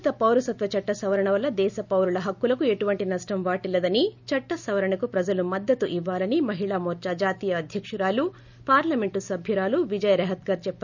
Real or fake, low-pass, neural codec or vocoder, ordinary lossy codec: real; 7.2 kHz; none; none